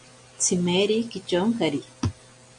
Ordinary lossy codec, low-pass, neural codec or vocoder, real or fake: MP3, 48 kbps; 9.9 kHz; none; real